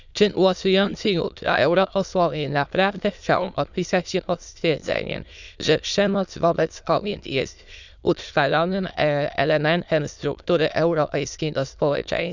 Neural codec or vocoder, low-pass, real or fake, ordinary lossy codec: autoencoder, 22.05 kHz, a latent of 192 numbers a frame, VITS, trained on many speakers; 7.2 kHz; fake; none